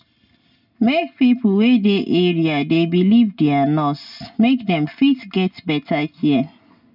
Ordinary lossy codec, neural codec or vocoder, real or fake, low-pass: none; none; real; 5.4 kHz